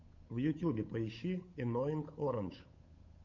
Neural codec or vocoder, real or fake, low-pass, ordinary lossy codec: codec, 16 kHz, 8 kbps, FunCodec, trained on Chinese and English, 25 frames a second; fake; 7.2 kHz; MP3, 48 kbps